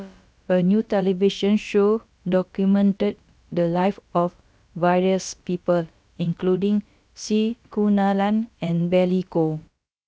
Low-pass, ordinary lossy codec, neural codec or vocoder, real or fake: none; none; codec, 16 kHz, about 1 kbps, DyCAST, with the encoder's durations; fake